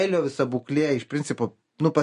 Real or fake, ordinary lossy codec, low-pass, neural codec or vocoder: real; MP3, 48 kbps; 10.8 kHz; none